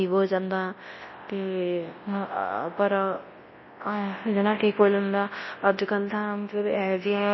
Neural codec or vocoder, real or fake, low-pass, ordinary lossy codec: codec, 24 kHz, 0.9 kbps, WavTokenizer, large speech release; fake; 7.2 kHz; MP3, 24 kbps